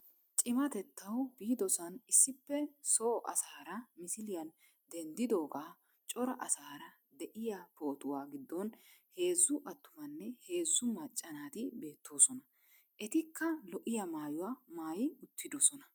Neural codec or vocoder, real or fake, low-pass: none; real; 19.8 kHz